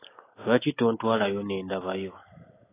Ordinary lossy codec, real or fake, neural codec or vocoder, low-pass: AAC, 16 kbps; real; none; 3.6 kHz